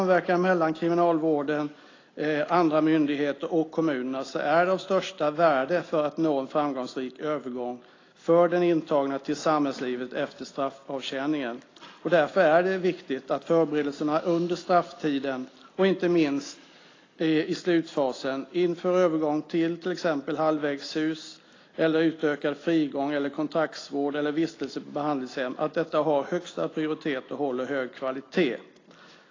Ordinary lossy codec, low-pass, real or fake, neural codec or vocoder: AAC, 32 kbps; 7.2 kHz; real; none